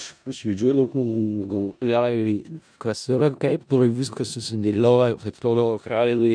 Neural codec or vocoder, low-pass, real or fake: codec, 16 kHz in and 24 kHz out, 0.4 kbps, LongCat-Audio-Codec, four codebook decoder; 9.9 kHz; fake